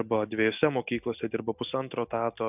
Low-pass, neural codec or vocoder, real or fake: 3.6 kHz; none; real